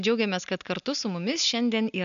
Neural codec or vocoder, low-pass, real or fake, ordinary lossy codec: none; 7.2 kHz; real; MP3, 96 kbps